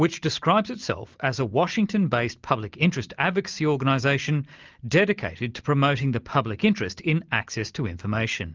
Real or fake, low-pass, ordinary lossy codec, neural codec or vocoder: real; 7.2 kHz; Opus, 24 kbps; none